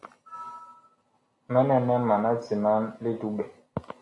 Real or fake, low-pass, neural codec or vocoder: real; 10.8 kHz; none